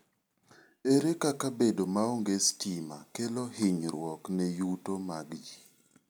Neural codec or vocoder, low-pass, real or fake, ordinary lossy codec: none; none; real; none